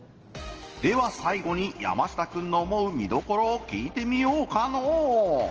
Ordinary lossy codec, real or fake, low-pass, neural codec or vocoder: Opus, 16 kbps; real; 7.2 kHz; none